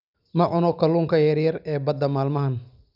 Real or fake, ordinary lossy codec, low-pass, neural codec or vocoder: real; none; 5.4 kHz; none